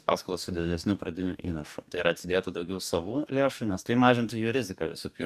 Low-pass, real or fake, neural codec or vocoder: 14.4 kHz; fake; codec, 44.1 kHz, 2.6 kbps, DAC